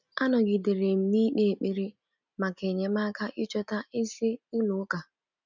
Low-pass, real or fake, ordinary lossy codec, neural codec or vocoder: 7.2 kHz; real; none; none